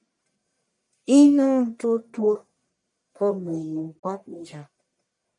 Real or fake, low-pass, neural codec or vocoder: fake; 10.8 kHz; codec, 44.1 kHz, 1.7 kbps, Pupu-Codec